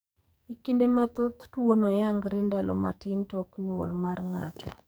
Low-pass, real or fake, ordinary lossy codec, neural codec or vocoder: none; fake; none; codec, 44.1 kHz, 2.6 kbps, SNAC